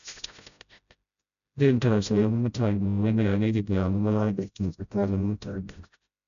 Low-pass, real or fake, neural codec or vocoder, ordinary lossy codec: 7.2 kHz; fake; codec, 16 kHz, 0.5 kbps, FreqCodec, smaller model; none